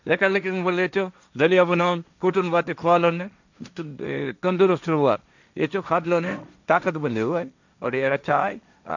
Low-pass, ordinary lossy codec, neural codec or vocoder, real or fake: 7.2 kHz; none; codec, 16 kHz, 1.1 kbps, Voila-Tokenizer; fake